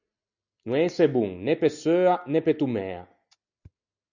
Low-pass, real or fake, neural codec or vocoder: 7.2 kHz; real; none